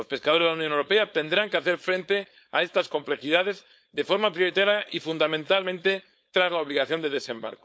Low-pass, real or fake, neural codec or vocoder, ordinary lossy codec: none; fake; codec, 16 kHz, 4.8 kbps, FACodec; none